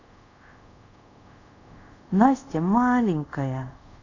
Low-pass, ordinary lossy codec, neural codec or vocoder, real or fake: 7.2 kHz; none; codec, 24 kHz, 0.5 kbps, DualCodec; fake